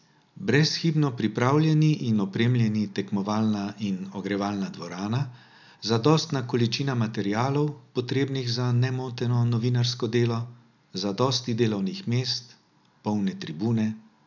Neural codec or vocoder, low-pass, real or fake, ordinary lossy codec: none; 7.2 kHz; real; none